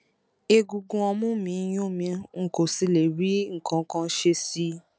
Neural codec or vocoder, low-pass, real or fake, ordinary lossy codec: none; none; real; none